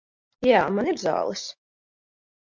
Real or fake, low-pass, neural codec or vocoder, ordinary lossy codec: real; 7.2 kHz; none; MP3, 48 kbps